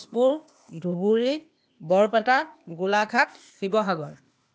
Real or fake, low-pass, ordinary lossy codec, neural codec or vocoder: fake; none; none; codec, 16 kHz, 0.8 kbps, ZipCodec